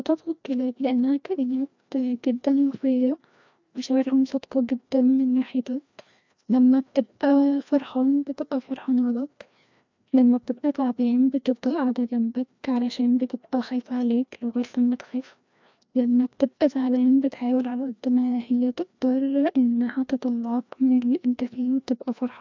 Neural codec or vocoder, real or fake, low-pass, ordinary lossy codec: codec, 16 kHz, 1 kbps, FreqCodec, larger model; fake; 7.2 kHz; MP3, 64 kbps